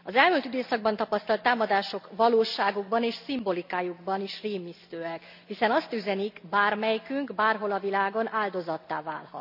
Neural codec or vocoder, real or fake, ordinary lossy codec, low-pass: none; real; none; 5.4 kHz